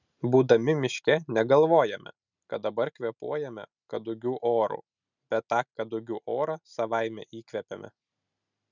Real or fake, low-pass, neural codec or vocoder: real; 7.2 kHz; none